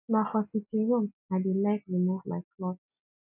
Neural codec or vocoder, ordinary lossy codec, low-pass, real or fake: none; none; 3.6 kHz; real